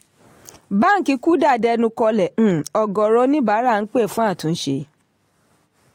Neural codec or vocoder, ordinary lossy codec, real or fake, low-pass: none; AAC, 48 kbps; real; 19.8 kHz